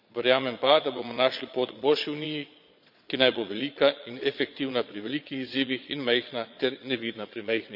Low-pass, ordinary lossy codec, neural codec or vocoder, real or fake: 5.4 kHz; none; vocoder, 22.05 kHz, 80 mel bands, Vocos; fake